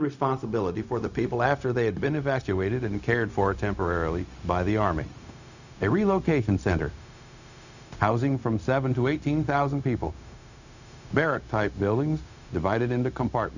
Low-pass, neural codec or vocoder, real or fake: 7.2 kHz; codec, 16 kHz, 0.4 kbps, LongCat-Audio-Codec; fake